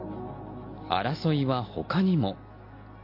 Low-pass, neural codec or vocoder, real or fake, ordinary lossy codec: 5.4 kHz; none; real; MP3, 32 kbps